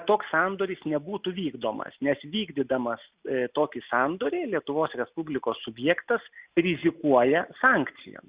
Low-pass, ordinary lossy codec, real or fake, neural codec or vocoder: 3.6 kHz; Opus, 64 kbps; real; none